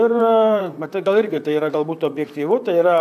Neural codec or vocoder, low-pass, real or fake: vocoder, 44.1 kHz, 128 mel bands, Pupu-Vocoder; 14.4 kHz; fake